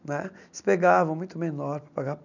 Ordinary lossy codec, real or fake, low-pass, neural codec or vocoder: none; real; 7.2 kHz; none